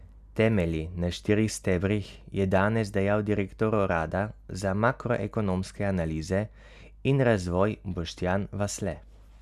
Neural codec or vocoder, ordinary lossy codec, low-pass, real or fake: none; none; 14.4 kHz; real